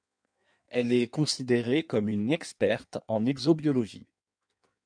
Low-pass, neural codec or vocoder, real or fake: 9.9 kHz; codec, 16 kHz in and 24 kHz out, 1.1 kbps, FireRedTTS-2 codec; fake